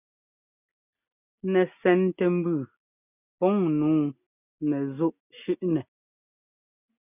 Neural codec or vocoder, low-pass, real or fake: none; 3.6 kHz; real